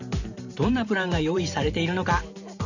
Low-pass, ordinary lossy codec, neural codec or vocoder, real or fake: 7.2 kHz; none; vocoder, 44.1 kHz, 128 mel bands every 512 samples, BigVGAN v2; fake